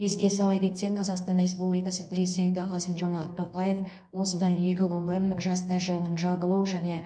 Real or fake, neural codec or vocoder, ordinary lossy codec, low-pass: fake; codec, 24 kHz, 0.9 kbps, WavTokenizer, medium music audio release; MP3, 64 kbps; 9.9 kHz